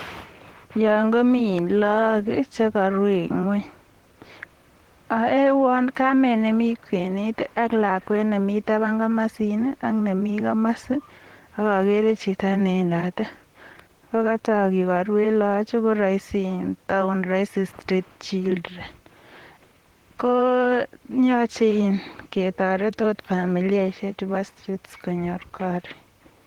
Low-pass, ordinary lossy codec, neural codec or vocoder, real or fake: 19.8 kHz; Opus, 16 kbps; vocoder, 44.1 kHz, 128 mel bands, Pupu-Vocoder; fake